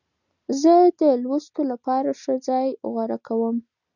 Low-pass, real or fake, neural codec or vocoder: 7.2 kHz; real; none